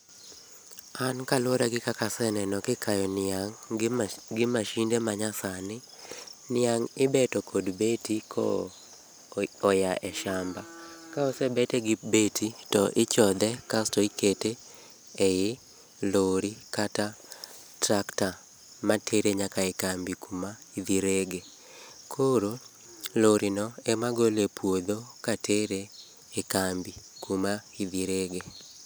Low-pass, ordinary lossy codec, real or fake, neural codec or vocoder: none; none; real; none